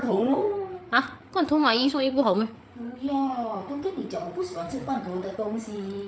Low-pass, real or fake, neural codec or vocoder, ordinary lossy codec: none; fake; codec, 16 kHz, 8 kbps, FreqCodec, larger model; none